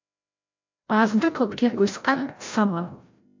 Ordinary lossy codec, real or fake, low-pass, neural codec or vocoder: MP3, 48 kbps; fake; 7.2 kHz; codec, 16 kHz, 0.5 kbps, FreqCodec, larger model